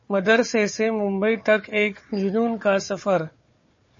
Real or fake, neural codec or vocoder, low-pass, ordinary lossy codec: fake; codec, 16 kHz, 4 kbps, FunCodec, trained on Chinese and English, 50 frames a second; 7.2 kHz; MP3, 32 kbps